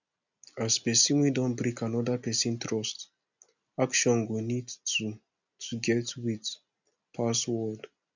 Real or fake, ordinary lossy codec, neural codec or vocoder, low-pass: real; none; none; 7.2 kHz